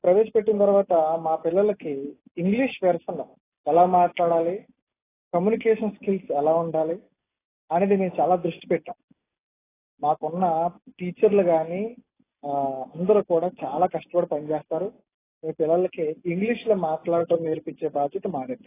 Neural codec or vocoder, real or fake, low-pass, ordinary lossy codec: none; real; 3.6 kHz; AAC, 16 kbps